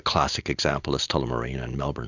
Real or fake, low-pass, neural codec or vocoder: real; 7.2 kHz; none